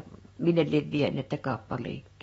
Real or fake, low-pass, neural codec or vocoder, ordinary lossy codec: fake; 19.8 kHz; autoencoder, 48 kHz, 128 numbers a frame, DAC-VAE, trained on Japanese speech; AAC, 24 kbps